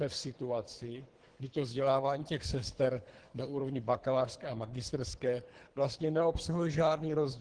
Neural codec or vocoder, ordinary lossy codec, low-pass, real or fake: codec, 24 kHz, 3 kbps, HILCodec; Opus, 16 kbps; 9.9 kHz; fake